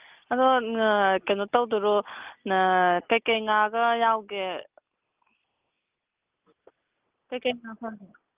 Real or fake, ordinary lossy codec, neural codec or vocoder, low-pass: real; Opus, 32 kbps; none; 3.6 kHz